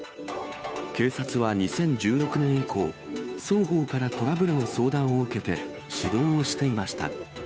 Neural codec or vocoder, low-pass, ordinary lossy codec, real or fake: codec, 16 kHz, 2 kbps, FunCodec, trained on Chinese and English, 25 frames a second; none; none; fake